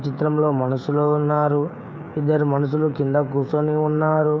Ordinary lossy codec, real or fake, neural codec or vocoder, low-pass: none; fake; codec, 16 kHz, 16 kbps, FreqCodec, smaller model; none